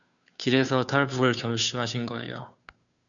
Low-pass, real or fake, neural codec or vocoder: 7.2 kHz; fake; codec, 16 kHz, 2 kbps, FunCodec, trained on Chinese and English, 25 frames a second